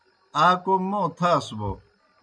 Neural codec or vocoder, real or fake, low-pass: none; real; 9.9 kHz